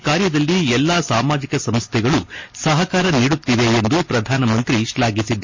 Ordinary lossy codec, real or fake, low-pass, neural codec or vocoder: MP3, 32 kbps; real; 7.2 kHz; none